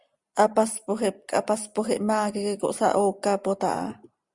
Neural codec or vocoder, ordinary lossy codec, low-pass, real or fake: none; Opus, 64 kbps; 10.8 kHz; real